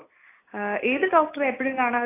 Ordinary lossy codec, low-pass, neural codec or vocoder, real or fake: AAC, 24 kbps; 3.6 kHz; none; real